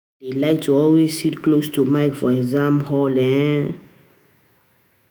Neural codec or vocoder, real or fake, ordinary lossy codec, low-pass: autoencoder, 48 kHz, 128 numbers a frame, DAC-VAE, trained on Japanese speech; fake; none; none